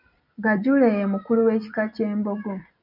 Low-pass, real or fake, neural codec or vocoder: 5.4 kHz; fake; vocoder, 44.1 kHz, 128 mel bands every 256 samples, BigVGAN v2